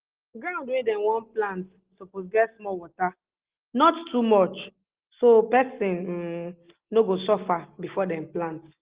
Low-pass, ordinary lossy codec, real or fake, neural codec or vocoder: 3.6 kHz; Opus, 16 kbps; real; none